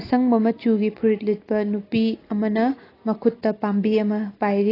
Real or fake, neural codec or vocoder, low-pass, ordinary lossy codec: real; none; 5.4 kHz; AAC, 24 kbps